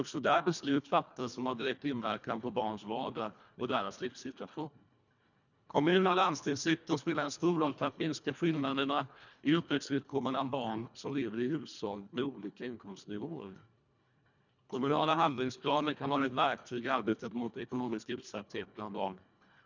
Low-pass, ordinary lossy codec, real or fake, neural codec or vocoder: 7.2 kHz; none; fake; codec, 24 kHz, 1.5 kbps, HILCodec